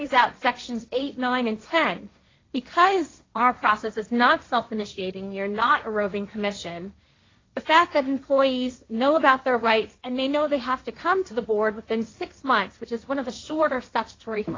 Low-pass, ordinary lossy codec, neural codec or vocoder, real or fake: 7.2 kHz; AAC, 32 kbps; codec, 16 kHz, 1.1 kbps, Voila-Tokenizer; fake